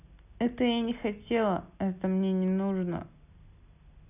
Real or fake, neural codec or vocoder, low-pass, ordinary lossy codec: real; none; 3.6 kHz; none